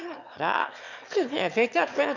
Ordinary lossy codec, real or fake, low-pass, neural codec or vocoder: none; fake; 7.2 kHz; autoencoder, 22.05 kHz, a latent of 192 numbers a frame, VITS, trained on one speaker